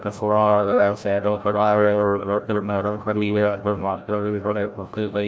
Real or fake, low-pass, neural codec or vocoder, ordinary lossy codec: fake; none; codec, 16 kHz, 0.5 kbps, FreqCodec, larger model; none